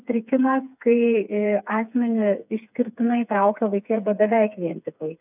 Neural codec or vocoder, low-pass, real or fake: codec, 16 kHz, 4 kbps, FreqCodec, smaller model; 3.6 kHz; fake